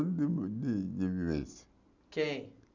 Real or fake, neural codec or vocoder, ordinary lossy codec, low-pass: real; none; none; 7.2 kHz